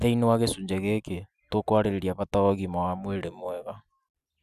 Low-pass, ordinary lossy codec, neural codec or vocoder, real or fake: 14.4 kHz; none; none; real